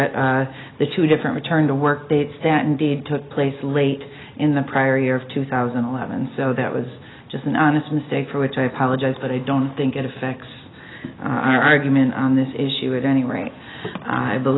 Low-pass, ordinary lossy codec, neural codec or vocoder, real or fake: 7.2 kHz; AAC, 16 kbps; none; real